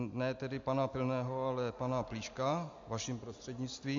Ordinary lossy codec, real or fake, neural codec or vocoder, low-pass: AAC, 48 kbps; real; none; 7.2 kHz